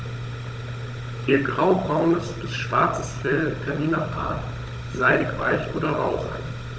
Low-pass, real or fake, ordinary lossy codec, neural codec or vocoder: none; fake; none; codec, 16 kHz, 16 kbps, FunCodec, trained on Chinese and English, 50 frames a second